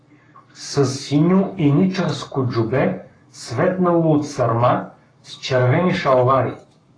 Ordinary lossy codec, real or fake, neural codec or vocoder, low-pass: AAC, 32 kbps; fake; codec, 44.1 kHz, 7.8 kbps, Pupu-Codec; 9.9 kHz